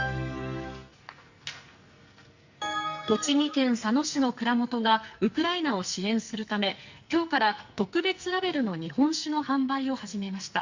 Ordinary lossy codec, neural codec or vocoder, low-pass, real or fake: Opus, 64 kbps; codec, 44.1 kHz, 2.6 kbps, SNAC; 7.2 kHz; fake